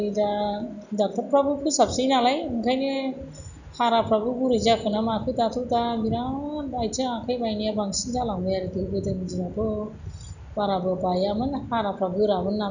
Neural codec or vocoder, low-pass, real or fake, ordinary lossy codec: none; 7.2 kHz; real; none